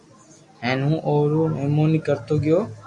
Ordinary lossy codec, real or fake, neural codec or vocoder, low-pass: AAC, 64 kbps; real; none; 10.8 kHz